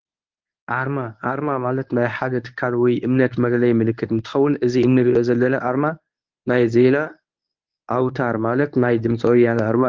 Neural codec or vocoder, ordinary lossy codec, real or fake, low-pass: codec, 24 kHz, 0.9 kbps, WavTokenizer, medium speech release version 1; Opus, 32 kbps; fake; 7.2 kHz